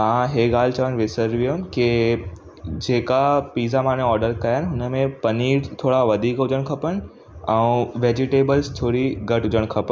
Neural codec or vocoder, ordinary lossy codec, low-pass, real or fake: none; none; none; real